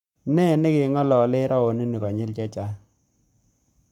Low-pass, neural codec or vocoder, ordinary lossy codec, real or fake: 19.8 kHz; codec, 44.1 kHz, 7.8 kbps, Pupu-Codec; none; fake